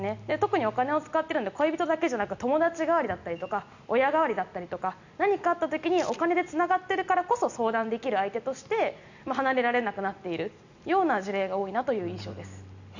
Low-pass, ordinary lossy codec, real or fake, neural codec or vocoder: 7.2 kHz; none; real; none